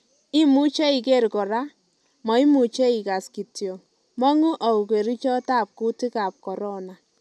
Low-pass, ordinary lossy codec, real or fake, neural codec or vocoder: none; none; real; none